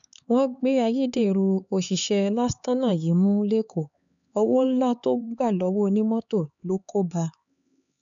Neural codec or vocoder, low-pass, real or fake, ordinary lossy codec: codec, 16 kHz, 4 kbps, X-Codec, HuBERT features, trained on LibriSpeech; 7.2 kHz; fake; none